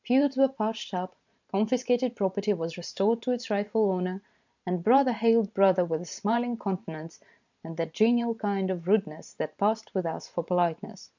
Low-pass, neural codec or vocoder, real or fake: 7.2 kHz; vocoder, 44.1 kHz, 128 mel bands every 512 samples, BigVGAN v2; fake